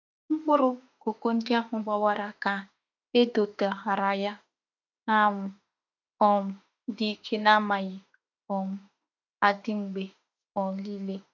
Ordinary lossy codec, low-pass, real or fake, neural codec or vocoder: none; 7.2 kHz; fake; autoencoder, 48 kHz, 32 numbers a frame, DAC-VAE, trained on Japanese speech